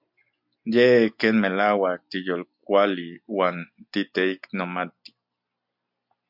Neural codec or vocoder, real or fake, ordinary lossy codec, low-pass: none; real; MP3, 48 kbps; 5.4 kHz